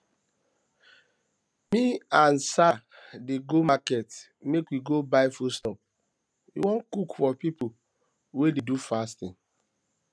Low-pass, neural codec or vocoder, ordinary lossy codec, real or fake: none; none; none; real